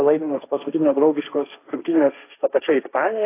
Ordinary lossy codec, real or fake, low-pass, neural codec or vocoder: AAC, 24 kbps; fake; 3.6 kHz; codec, 16 kHz, 1.1 kbps, Voila-Tokenizer